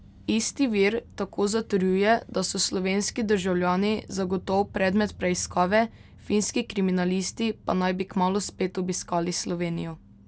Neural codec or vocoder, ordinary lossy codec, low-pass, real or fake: none; none; none; real